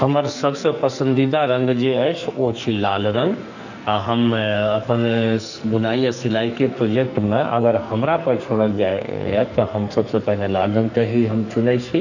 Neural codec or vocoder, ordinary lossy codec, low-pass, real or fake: codec, 44.1 kHz, 2.6 kbps, SNAC; none; 7.2 kHz; fake